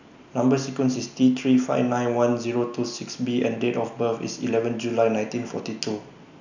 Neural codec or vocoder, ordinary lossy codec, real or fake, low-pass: none; none; real; 7.2 kHz